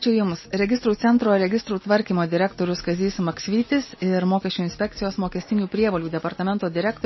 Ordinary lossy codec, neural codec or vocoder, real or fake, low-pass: MP3, 24 kbps; none; real; 7.2 kHz